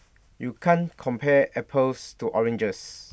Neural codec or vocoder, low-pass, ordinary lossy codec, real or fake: none; none; none; real